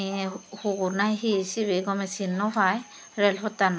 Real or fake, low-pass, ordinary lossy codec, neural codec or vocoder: real; none; none; none